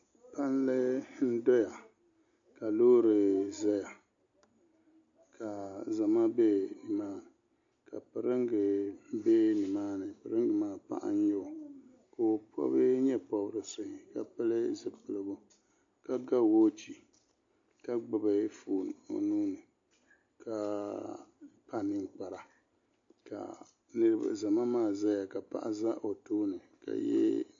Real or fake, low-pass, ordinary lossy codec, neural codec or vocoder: real; 7.2 kHz; AAC, 48 kbps; none